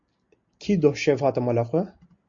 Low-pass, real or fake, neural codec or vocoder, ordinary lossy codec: 7.2 kHz; real; none; MP3, 48 kbps